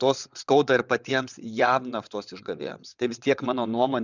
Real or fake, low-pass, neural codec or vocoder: fake; 7.2 kHz; vocoder, 22.05 kHz, 80 mel bands, WaveNeXt